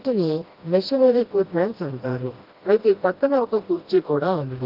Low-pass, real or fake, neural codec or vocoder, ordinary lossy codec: 5.4 kHz; fake; codec, 16 kHz, 1 kbps, FreqCodec, smaller model; Opus, 32 kbps